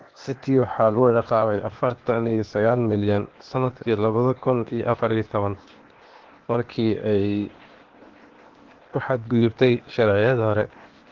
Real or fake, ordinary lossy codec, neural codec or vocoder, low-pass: fake; Opus, 16 kbps; codec, 16 kHz, 0.8 kbps, ZipCodec; 7.2 kHz